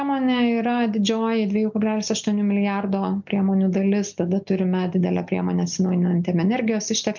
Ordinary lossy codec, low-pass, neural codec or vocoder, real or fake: MP3, 64 kbps; 7.2 kHz; none; real